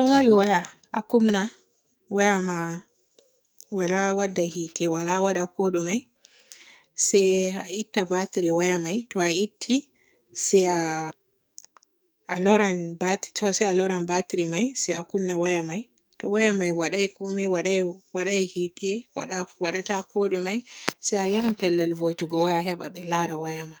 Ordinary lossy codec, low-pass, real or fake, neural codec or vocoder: none; none; fake; codec, 44.1 kHz, 2.6 kbps, SNAC